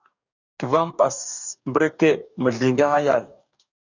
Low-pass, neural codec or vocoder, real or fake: 7.2 kHz; codec, 44.1 kHz, 2.6 kbps, DAC; fake